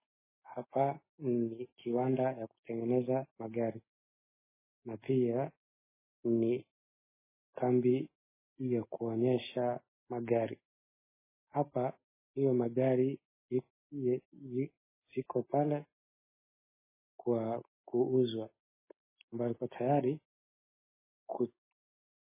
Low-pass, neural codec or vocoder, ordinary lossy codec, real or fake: 3.6 kHz; none; MP3, 16 kbps; real